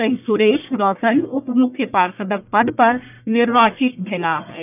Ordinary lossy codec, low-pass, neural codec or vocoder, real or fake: none; 3.6 kHz; codec, 44.1 kHz, 1.7 kbps, Pupu-Codec; fake